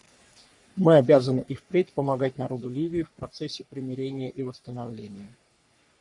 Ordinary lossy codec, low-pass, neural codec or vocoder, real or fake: MP3, 96 kbps; 10.8 kHz; codec, 44.1 kHz, 3.4 kbps, Pupu-Codec; fake